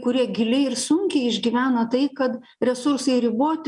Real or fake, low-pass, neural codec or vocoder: real; 10.8 kHz; none